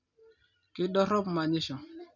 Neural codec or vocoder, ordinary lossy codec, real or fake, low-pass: none; none; real; 7.2 kHz